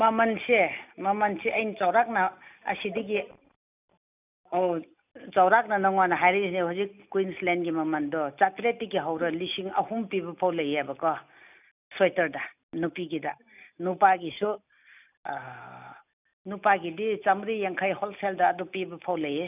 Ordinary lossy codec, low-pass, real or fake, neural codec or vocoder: none; 3.6 kHz; real; none